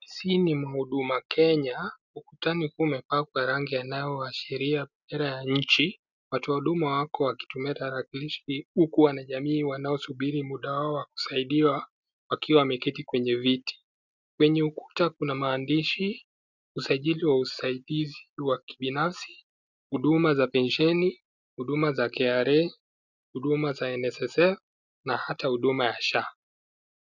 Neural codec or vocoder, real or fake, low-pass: none; real; 7.2 kHz